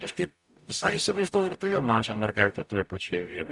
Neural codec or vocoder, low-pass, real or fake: codec, 44.1 kHz, 0.9 kbps, DAC; 10.8 kHz; fake